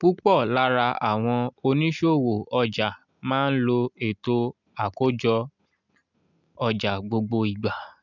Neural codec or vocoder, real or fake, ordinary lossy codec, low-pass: none; real; none; 7.2 kHz